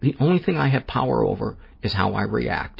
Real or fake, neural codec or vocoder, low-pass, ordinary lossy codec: real; none; 5.4 kHz; MP3, 24 kbps